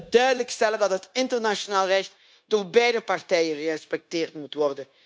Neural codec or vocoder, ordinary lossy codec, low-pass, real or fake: codec, 16 kHz, 0.9 kbps, LongCat-Audio-Codec; none; none; fake